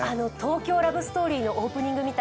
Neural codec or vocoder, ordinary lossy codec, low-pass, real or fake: none; none; none; real